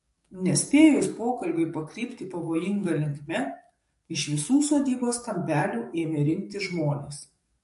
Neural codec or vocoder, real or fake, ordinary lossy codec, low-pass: codec, 44.1 kHz, 7.8 kbps, DAC; fake; MP3, 48 kbps; 14.4 kHz